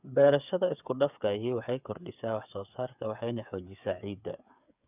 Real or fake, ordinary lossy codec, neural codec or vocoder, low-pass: fake; none; codec, 16 kHz, 8 kbps, FreqCodec, smaller model; 3.6 kHz